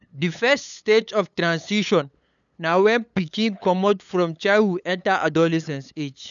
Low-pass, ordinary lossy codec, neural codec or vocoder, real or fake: 7.2 kHz; none; codec, 16 kHz, 8 kbps, FunCodec, trained on LibriTTS, 25 frames a second; fake